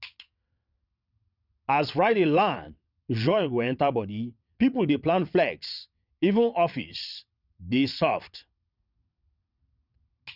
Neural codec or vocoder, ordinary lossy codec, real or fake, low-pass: vocoder, 22.05 kHz, 80 mel bands, WaveNeXt; none; fake; 5.4 kHz